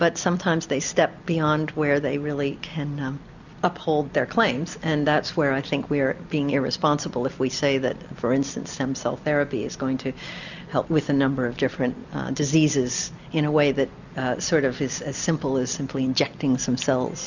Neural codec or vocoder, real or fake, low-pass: none; real; 7.2 kHz